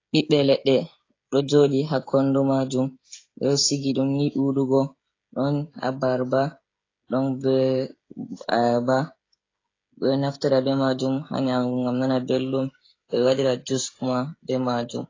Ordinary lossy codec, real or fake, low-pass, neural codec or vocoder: AAC, 32 kbps; fake; 7.2 kHz; codec, 16 kHz, 16 kbps, FreqCodec, smaller model